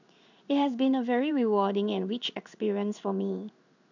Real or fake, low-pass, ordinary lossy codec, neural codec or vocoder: fake; 7.2 kHz; none; codec, 16 kHz in and 24 kHz out, 1 kbps, XY-Tokenizer